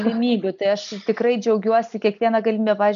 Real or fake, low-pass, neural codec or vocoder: real; 7.2 kHz; none